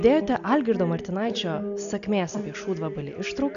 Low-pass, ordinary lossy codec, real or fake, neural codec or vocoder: 7.2 kHz; AAC, 96 kbps; real; none